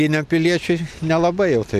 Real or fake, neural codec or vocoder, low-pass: real; none; 14.4 kHz